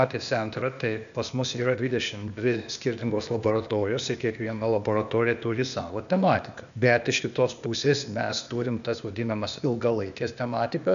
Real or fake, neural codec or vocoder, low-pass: fake; codec, 16 kHz, 0.8 kbps, ZipCodec; 7.2 kHz